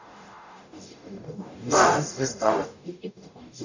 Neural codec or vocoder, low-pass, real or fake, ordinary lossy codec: codec, 44.1 kHz, 0.9 kbps, DAC; 7.2 kHz; fake; none